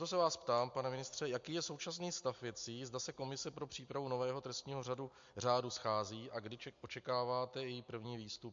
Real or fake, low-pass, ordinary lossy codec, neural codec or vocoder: real; 7.2 kHz; MP3, 48 kbps; none